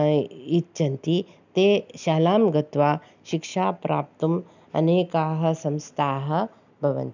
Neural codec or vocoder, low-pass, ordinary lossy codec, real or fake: none; 7.2 kHz; none; real